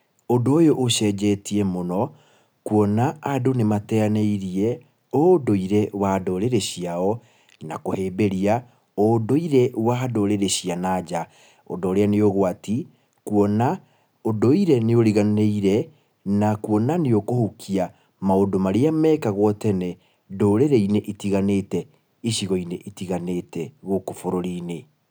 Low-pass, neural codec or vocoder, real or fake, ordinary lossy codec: none; none; real; none